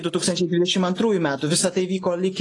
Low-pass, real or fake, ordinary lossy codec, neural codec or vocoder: 10.8 kHz; real; AAC, 32 kbps; none